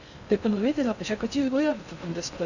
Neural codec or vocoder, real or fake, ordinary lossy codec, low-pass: codec, 16 kHz in and 24 kHz out, 0.6 kbps, FocalCodec, streaming, 2048 codes; fake; none; 7.2 kHz